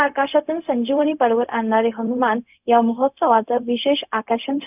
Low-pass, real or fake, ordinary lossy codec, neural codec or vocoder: 3.6 kHz; fake; none; codec, 16 kHz, 0.4 kbps, LongCat-Audio-Codec